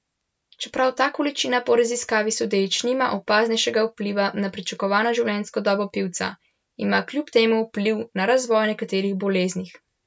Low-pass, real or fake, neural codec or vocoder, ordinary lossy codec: none; real; none; none